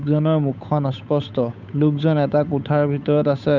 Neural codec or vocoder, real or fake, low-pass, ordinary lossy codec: codec, 16 kHz, 8 kbps, FunCodec, trained on Chinese and English, 25 frames a second; fake; 7.2 kHz; none